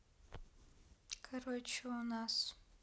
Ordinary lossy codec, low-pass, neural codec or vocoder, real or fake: none; none; none; real